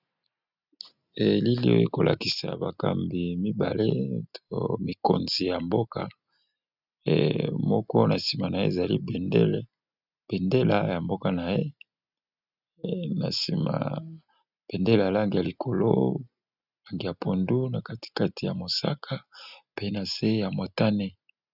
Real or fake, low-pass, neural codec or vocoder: real; 5.4 kHz; none